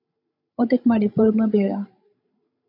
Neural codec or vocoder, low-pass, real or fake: codec, 16 kHz, 16 kbps, FreqCodec, larger model; 5.4 kHz; fake